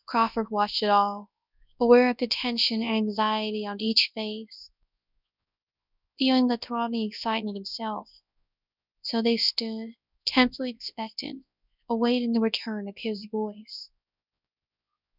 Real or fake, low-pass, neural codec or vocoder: fake; 5.4 kHz; codec, 24 kHz, 0.9 kbps, WavTokenizer, large speech release